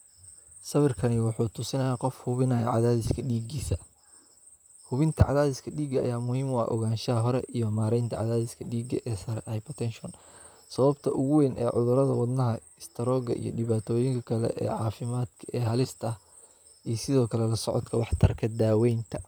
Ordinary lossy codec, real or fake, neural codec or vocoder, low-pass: none; fake; vocoder, 44.1 kHz, 128 mel bands, Pupu-Vocoder; none